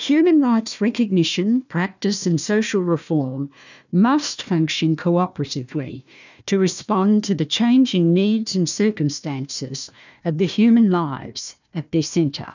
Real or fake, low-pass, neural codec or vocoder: fake; 7.2 kHz; codec, 16 kHz, 1 kbps, FunCodec, trained on Chinese and English, 50 frames a second